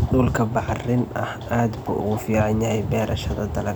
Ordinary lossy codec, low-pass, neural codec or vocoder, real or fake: none; none; none; real